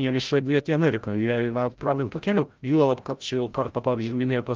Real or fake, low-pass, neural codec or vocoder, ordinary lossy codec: fake; 7.2 kHz; codec, 16 kHz, 0.5 kbps, FreqCodec, larger model; Opus, 24 kbps